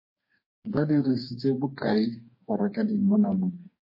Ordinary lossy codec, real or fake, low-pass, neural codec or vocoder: MP3, 24 kbps; fake; 5.4 kHz; codec, 44.1 kHz, 2.6 kbps, DAC